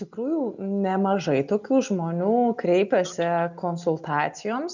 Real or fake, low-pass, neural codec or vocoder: real; 7.2 kHz; none